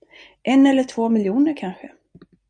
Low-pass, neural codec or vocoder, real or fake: 9.9 kHz; none; real